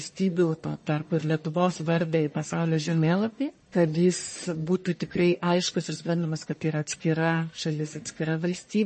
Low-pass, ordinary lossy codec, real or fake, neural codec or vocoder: 10.8 kHz; MP3, 32 kbps; fake; codec, 44.1 kHz, 1.7 kbps, Pupu-Codec